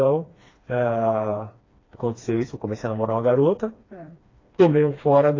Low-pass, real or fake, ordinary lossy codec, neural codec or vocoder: 7.2 kHz; fake; AAC, 32 kbps; codec, 16 kHz, 2 kbps, FreqCodec, smaller model